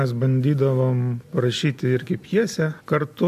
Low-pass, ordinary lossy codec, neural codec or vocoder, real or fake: 14.4 kHz; MP3, 64 kbps; none; real